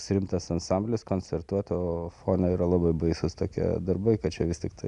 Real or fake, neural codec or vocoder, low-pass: real; none; 10.8 kHz